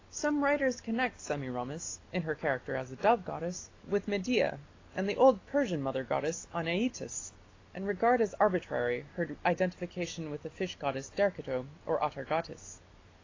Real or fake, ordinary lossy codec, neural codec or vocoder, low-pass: real; AAC, 32 kbps; none; 7.2 kHz